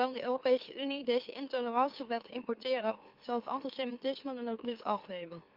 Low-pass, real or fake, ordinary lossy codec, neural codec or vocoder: 5.4 kHz; fake; Opus, 24 kbps; autoencoder, 44.1 kHz, a latent of 192 numbers a frame, MeloTTS